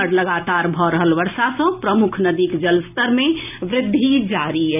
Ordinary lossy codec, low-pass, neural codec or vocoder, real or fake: none; 3.6 kHz; none; real